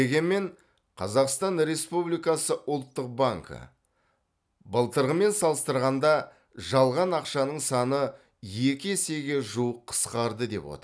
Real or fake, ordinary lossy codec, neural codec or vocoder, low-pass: real; none; none; none